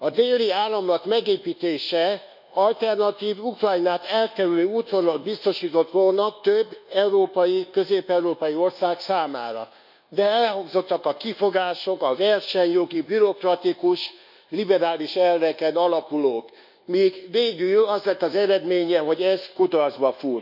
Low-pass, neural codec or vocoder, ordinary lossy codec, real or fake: 5.4 kHz; codec, 24 kHz, 1.2 kbps, DualCodec; none; fake